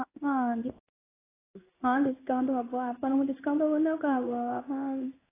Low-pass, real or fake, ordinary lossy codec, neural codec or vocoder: 3.6 kHz; fake; AAC, 16 kbps; codec, 16 kHz in and 24 kHz out, 1 kbps, XY-Tokenizer